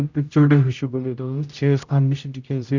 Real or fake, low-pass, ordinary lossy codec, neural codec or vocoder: fake; 7.2 kHz; none; codec, 16 kHz, 0.5 kbps, X-Codec, HuBERT features, trained on general audio